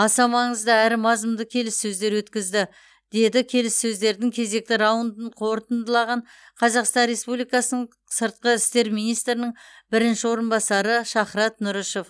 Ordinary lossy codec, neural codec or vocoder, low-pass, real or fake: none; none; none; real